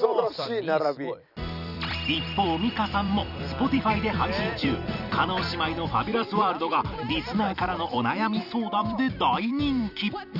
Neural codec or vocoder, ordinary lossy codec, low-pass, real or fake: vocoder, 44.1 kHz, 128 mel bands every 256 samples, BigVGAN v2; none; 5.4 kHz; fake